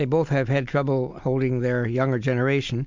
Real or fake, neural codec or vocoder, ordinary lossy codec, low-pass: real; none; MP3, 64 kbps; 7.2 kHz